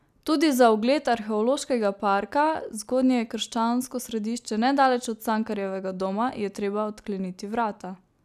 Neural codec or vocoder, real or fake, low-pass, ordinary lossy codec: none; real; 14.4 kHz; none